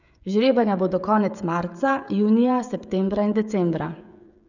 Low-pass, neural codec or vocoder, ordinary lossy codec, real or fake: 7.2 kHz; codec, 16 kHz, 16 kbps, FreqCodec, smaller model; none; fake